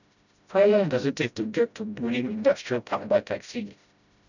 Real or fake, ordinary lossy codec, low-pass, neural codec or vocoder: fake; none; 7.2 kHz; codec, 16 kHz, 0.5 kbps, FreqCodec, smaller model